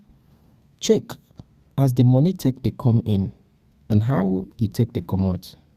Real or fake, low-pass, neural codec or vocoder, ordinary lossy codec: fake; 14.4 kHz; codec, 32 kHz, 1.9 kbps, SNAC; Opus, 64 kbps